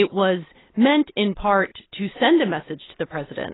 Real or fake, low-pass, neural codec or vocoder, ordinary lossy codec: real; 7.2 kHz; none; AAC, 16 kbps